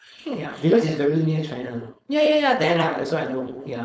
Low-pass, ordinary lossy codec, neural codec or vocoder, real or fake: none; none; codec, 16 kHz, 4.8 kbps, FACodec; fake